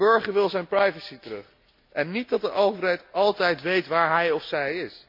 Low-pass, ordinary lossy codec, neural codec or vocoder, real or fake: 5.4 kHz; MP3, 48 kbps; none; real